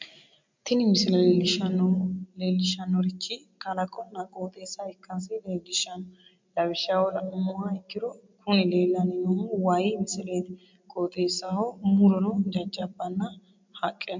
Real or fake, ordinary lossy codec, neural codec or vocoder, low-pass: real; MP3, 64 kbps; none; 7.2 kHz